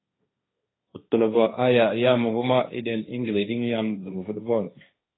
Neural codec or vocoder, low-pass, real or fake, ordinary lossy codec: codec, 16 kHz, 1.1 kbps, Voila-Tokenizer; 7.2 kHz; fake; AAC, 16 kbps